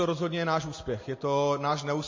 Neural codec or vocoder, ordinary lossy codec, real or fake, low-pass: none; MP3, 32 kbps; real; 7.2 kHz